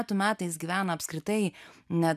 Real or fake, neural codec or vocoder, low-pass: real; none; 14.4 kHz